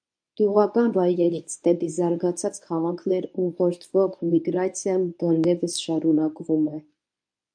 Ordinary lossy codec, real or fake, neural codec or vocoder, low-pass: AAC, 64 kbps; fake; codec, 24 kHz, 0.9 kbps, WavTokenizer, medium speech release version 1; 9.9 kHz